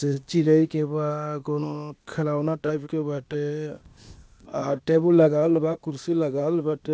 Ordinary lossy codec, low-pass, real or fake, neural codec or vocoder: none; none; fake; codec, 16 kHz, 0.8 kbps, ZipCodec